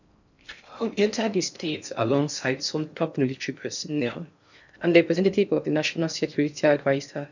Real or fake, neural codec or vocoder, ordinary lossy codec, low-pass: fake; codec, 16 kHz in and 24 kHz out, 0.6 kbps, FocalCodec, streaming, 4096 codes; none; 7.2 kHz